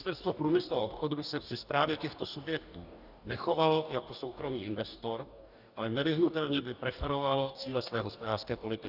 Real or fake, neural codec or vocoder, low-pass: fake; codec, 44.1 kHz, 2.6 kbps, DAC; 5.4 kHz